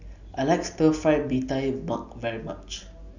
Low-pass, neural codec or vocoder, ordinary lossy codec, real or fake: 7.2 kHz; none; none; real